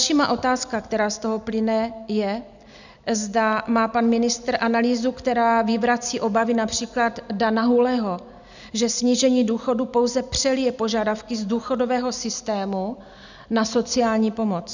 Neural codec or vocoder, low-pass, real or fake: none; 7.2 kHz; real